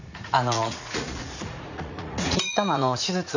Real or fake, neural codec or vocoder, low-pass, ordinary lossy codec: fake; vocoder, 44.1 kHz, 80 mel bands, Vocos; 7.2 kHz; none